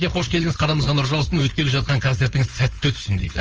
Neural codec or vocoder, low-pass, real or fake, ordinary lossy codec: codec, 16 kHz, 4.8 kbps, FACodec; 7.2 kHz; fake; Opus, 24 kbps